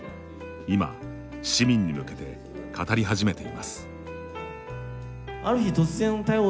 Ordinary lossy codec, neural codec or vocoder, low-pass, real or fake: none; none; none; real